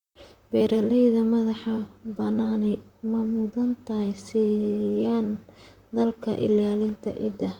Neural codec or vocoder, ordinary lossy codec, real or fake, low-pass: vocoder, 44.1 kHz, 128 mel bands, Pupu-Vocoder; Opus, 64 kbps; fake; 19.8 kHz